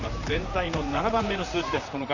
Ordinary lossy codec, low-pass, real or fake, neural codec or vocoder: none; 7.2 kHz; fake; vocoder, 44.1 kHz, 128 mel bands, Pupu-Vocoder